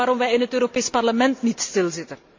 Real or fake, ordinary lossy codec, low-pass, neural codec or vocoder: real; MP3, 48 kbps; 7.2 kHz; none